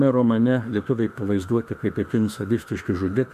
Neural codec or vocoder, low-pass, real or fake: autoencoder, 48 kHz, 32 numbers a frame, DAC-VAE, trained on Japanese speech; 14.4 kHz; fake